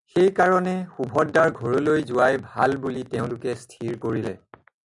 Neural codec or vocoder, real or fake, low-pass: none; real; 10.8 kHz